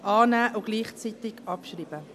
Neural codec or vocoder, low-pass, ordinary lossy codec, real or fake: none; 14.4 kHz; MP3, 96 kbps; real